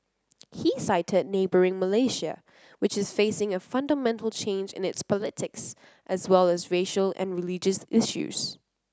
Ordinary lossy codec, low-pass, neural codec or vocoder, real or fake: none; none; none; real